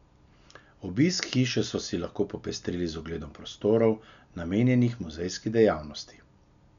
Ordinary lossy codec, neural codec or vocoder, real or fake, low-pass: none; none; real; 7.2 kHz